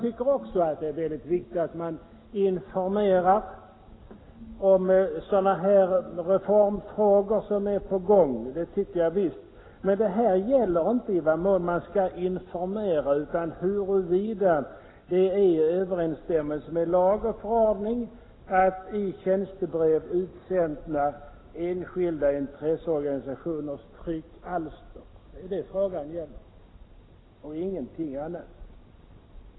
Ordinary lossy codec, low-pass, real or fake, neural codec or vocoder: AAC, 16 kbps; 7.2 kHz; real; none